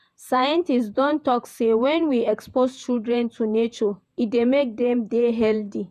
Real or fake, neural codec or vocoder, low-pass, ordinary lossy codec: fake; vocoder, 48 kHz, 128 mel bands, Vocos; 14.4 kHz; none